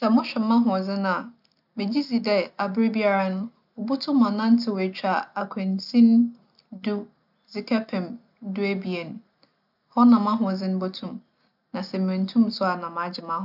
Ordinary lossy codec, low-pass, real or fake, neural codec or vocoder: none; 5.4 kHz; real; none